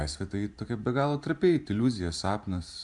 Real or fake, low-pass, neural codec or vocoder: fake; 10.8 kHz; vocoder, 44.1 kHz, 128 mel bands every 512 samples, BigVGAN v2